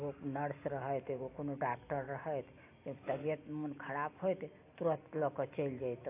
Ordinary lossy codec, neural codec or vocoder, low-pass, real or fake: AAC, 24 kbps; none; 3.6 kHz; real